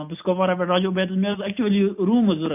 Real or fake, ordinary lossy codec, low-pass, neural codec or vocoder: real; none; 3.6 kHz; none